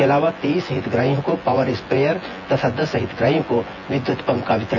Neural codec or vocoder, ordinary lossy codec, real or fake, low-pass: vocoder, 24 kHz, 100 mel bands, Vocos; none; fake; 7.2 kHz